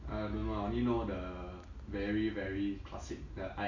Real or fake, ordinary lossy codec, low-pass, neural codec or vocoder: real; none; 7.2 kHz; none